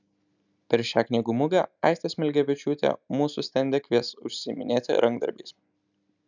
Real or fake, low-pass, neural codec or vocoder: real; 7.2 kHz; none